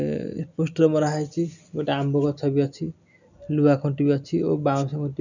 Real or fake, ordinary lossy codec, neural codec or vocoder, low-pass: real; none; none; 7.2 kHz